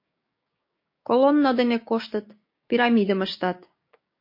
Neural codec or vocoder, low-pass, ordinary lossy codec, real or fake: codec, 16 kHz, 6 kbps, DAC; 5.4 kHz; MP3, 32 kbps; fake